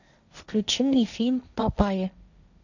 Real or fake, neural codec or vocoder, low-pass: fake; codec, 16 kHz, 1.1 kbps, Voila-Tokenizer; 7.2 kHz